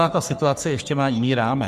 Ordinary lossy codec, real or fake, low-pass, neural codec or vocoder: Opus, 64 kbps; fake; 14.4 kHz; codec, 44.1 kHz, 3.4 kbps, Pupu-Codec